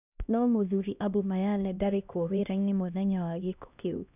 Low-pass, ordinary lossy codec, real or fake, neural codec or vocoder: 3.6 kHz; none; fake; codec, 16 kHz, 1 kbps, X-Codec, HuBERT features, trained on LibriSpeech